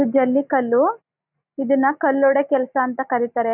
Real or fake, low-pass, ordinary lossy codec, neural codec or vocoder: real; 3.6 kHz; none; none